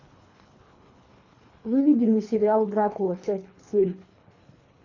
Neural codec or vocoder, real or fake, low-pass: codec, 24 kHz, 3 kbps, HILCodec; fake; 7.2 kHz